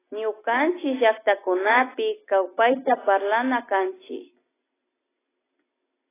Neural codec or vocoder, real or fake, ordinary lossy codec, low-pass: none; real; AAC, 16 kbps; 3.6 kHz